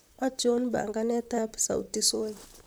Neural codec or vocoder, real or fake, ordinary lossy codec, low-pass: vocoder, 44.1 kHz, 128 mel bands, Pupu-Vocoder; fake; none; none